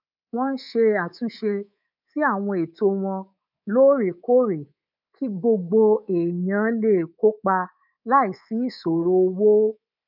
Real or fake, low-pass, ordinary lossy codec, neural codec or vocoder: fake; 5.4 kHz; none; codec, 24 kHz, 3.1 kbps, DualCodec